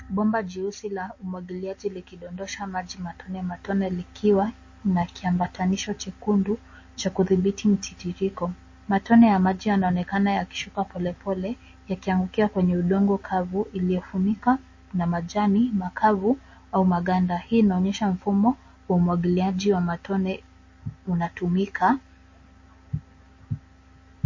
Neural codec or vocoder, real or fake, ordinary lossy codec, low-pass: none; real; MP3, 32 kbps; 7.2 kHz